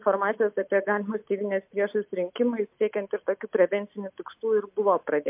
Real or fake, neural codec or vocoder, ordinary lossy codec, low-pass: real; none; MP3, 32 kbps; 3.6 kHz